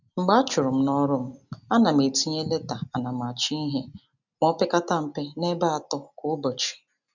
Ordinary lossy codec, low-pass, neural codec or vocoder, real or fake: none; 7.2 kHz; none; real